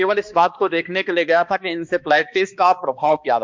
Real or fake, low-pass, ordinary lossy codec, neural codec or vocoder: fake; 7.2 kHz; MP3, 64 kbps; codec, 16 kHz, 2 kbps, X-Codec, HuBERT features, trained on balanced general audio